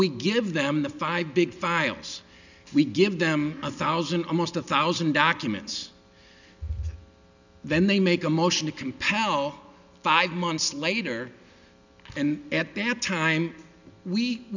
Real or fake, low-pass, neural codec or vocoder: real; 7.2 kHz; none